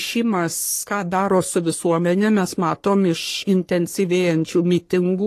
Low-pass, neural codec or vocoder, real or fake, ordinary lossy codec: 14.4 kHz; codec, 44.1 kHz, 3.4 kbps, Pupu-Codec; fake; AAC, 48 kbps